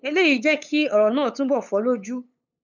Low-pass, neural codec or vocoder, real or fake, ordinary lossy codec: 7.2 kHz; codec, 16 kHz, 8 kbps, FunCodec, trained on LibriTTS, 25 frames a second; fake; none